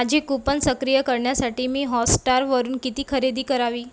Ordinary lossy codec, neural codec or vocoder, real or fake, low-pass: none; none; real; none